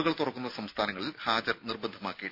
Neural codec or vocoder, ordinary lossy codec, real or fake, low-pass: none; none; real; 5.4 kHz